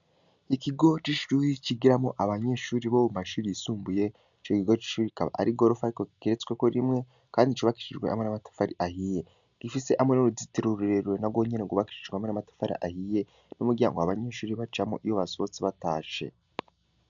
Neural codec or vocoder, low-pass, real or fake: none; 7.2 kHz; real